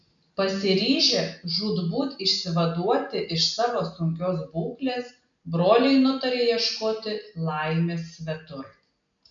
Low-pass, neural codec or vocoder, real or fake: 7.2 kHz; none; real